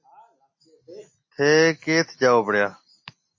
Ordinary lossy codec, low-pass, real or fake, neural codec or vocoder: MP3, 32 kbps; 7.2 kHz; real; none